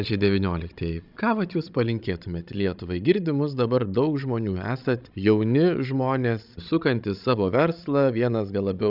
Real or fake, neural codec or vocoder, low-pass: fake; codec, 16 kHz, 16 kbps, FreqCodec, larger model; 5.4 kHz